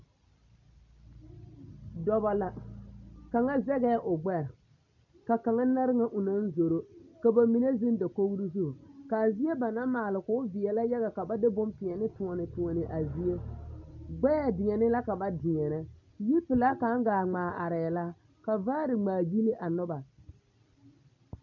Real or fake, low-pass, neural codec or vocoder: real; 7.2 kHz; none